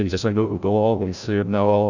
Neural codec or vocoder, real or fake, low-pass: codec, 16 kHz, 0.5 kbps, FreqCodec, larger model; fake; 7.2 kHz